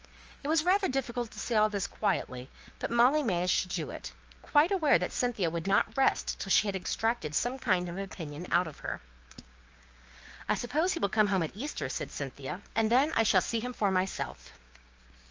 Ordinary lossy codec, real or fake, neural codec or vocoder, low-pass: Opus, 24 kbps; fake; codec, 16 kHz, 6 kbps, DAC; 7.2 kHz